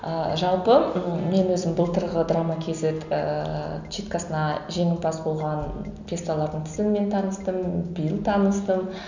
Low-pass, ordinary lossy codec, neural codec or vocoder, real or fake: 7.2 kHz; none; none; real